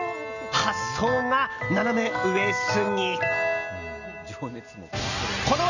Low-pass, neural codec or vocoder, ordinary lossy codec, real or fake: 7.2 kHz; none; none; real